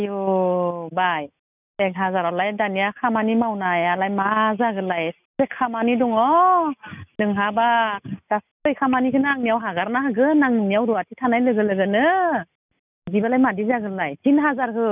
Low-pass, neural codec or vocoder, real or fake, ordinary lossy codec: 3.6 kHz; none; real; none